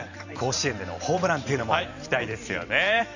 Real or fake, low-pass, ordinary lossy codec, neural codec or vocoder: fake; 7.2 kHz; none; vocoder, 44.1 kHz, 128 mel bands every 512 samples, BigVGAN v2